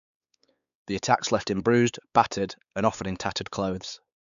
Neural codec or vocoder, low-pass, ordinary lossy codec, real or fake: codec, 16 kHz, 4 kbps, X-Codec, WavLM features, trained on Multilingual LibriSpeech; 7.2 kHz; none; fake